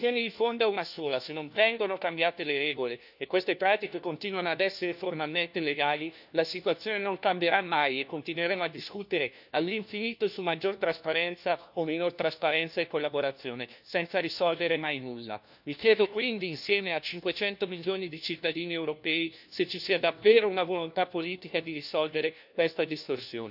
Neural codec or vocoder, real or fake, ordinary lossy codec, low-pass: codec, 16 kHz, 1 kbps, FunCodec, trained on LibriTTS, 50 frames a second; fake; none; 5.4 kHz